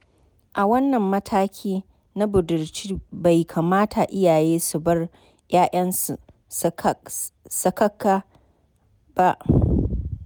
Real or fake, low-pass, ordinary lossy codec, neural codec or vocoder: real; none; none; none